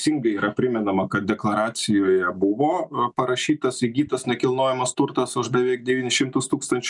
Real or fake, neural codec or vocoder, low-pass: real; none; 10.8 kHz